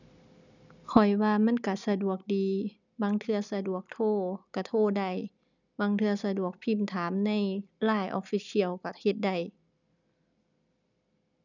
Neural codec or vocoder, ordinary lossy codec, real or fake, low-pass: none; none; real; 7.2 kHz